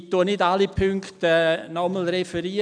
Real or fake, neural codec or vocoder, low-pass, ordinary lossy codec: real; none; 9.9 kHz; none